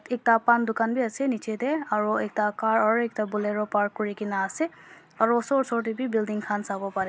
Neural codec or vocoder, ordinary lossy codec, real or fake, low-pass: none; none; real; none